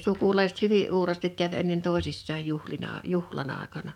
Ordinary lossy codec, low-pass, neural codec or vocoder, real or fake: none; 19.8 kHz; codec, 44.1 kHz, 7.8 kbps, DAC; fake